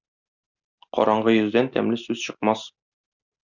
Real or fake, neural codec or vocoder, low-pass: real; none; 7.2 kHz